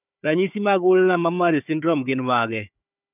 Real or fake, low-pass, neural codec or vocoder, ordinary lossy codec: fake; 3.6 kHz; codec, 16 kHz, 4 kbps, FunCodec, trained on Chinese and English, 50 frames a second; none